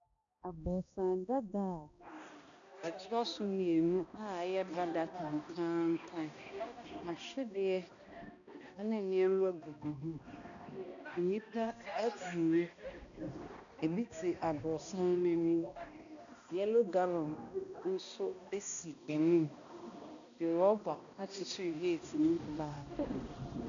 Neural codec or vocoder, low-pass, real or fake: codec, 16 kHz, 1 kbps, X-Codec, HuBERT features, trained on balanced general audio; 7.2 kHz; fake